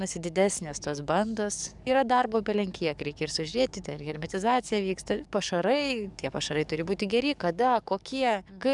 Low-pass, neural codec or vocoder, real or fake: 10.8 kHz; codec, 44.1 kHz, 7.8 kbps, DAC; fake